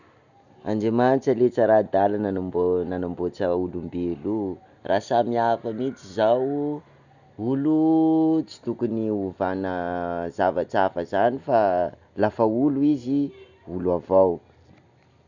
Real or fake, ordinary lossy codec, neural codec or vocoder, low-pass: real; none; none; 7.2 kHz